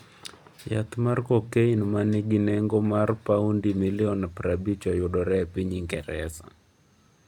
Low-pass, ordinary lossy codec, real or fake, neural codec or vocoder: 19.8 kHz; none; real; none